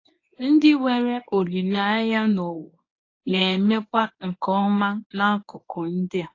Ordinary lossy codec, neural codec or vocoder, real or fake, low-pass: AAC, 32 kbps; codec, 24 kHz, 0.9 kbps, WavTokenizer, medium speech release version 1; fake; 7.2 kHz